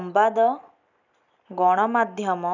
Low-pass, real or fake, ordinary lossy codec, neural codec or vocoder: 7.2 kHz; real; none; none